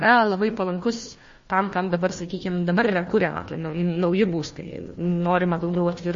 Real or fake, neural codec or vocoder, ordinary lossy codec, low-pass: fake; codec, 16 kHz, 1 kbps, FunCodec, trained on Chinese and English, 50 frames a second; MP3, 32 kbps; 7.2 kHz